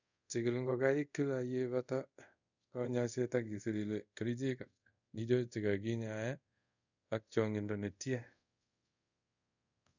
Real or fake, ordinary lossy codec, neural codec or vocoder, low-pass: fake; none; codec, 24 kHz, 0.5 kbps, DualCodec; 7.2 kHz